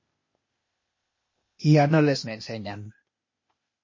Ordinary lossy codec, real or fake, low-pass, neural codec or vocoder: MP3, 32 kbps; fake; 7.2 kHz; codec, 16 kHz, 0.8 kbps, ZipCodec